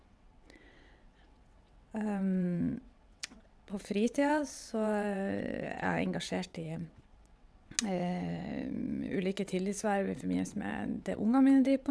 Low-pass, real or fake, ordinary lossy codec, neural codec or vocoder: none; fake; none; vocoder, 22.05 kHz, 80 mel bands, WaveNeXt